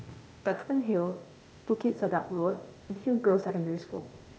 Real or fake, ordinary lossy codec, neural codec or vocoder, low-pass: fake; none; codec, 16 kHz, 0.8 kbps, ZipCodec; none